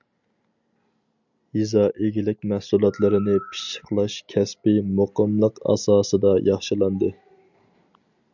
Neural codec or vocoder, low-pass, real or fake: none; 7.2 kHz; real